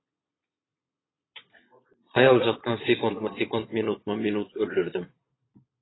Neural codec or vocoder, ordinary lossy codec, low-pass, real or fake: vocoder, 22.05 kHz, 80 mel bands, Vocos; AAC, 16 kbps; 7.2 kHz; fake